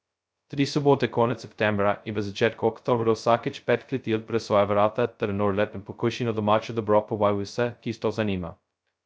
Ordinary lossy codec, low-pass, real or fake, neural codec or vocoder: none; none; fake; codec, 16 kHz, 0.2 kbps, FocalCodec